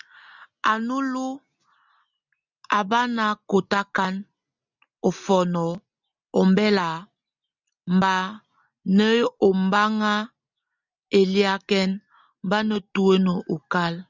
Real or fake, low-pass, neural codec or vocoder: real; 7.2 kHz; none